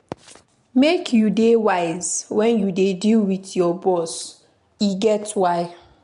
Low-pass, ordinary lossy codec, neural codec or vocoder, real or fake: 10.8 kHz; MP3, 64 kbps; none; real